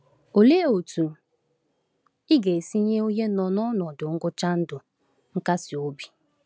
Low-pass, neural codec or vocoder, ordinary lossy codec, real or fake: none; none; none; real